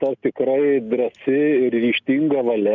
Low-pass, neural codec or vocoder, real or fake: 7.2 kHz; none; real